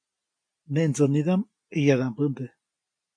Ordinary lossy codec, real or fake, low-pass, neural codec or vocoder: MP3, 64 kbps; real; 9.9 kHz; none